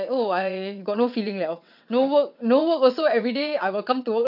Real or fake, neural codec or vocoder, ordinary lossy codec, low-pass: fake; vocoder, 22.05 kHz, 80 mel bands, WaveNeXt; none; 5.4 kHz